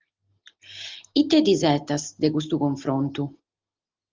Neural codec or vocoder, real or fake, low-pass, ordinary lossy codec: none; real; 7.2 kHz; Opus, 32 kbps